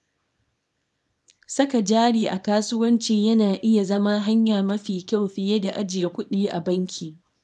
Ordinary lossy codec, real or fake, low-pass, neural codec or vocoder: none; fake; none; codec, 24 kHz, 0.9 kbps, WavTokenizer, small release